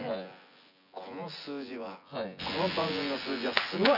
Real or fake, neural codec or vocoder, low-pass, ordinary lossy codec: fake; vocoder, 24 kHz, 100 mel bands, Vocos; 5.4 kHz; none